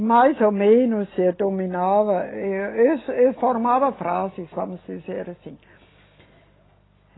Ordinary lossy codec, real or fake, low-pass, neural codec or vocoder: AAC, 16 kbps; real; 7.2 kHz; none